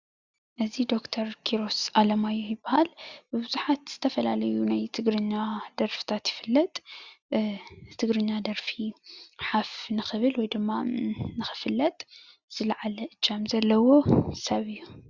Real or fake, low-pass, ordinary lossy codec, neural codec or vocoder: real; 7.2 kHz; Opus, 64 kbps; none